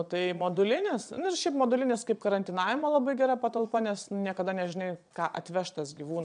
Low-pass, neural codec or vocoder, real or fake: 9.9 kHz; vocoder, 22.05 kHz, 80 mel bands, Vocos; fake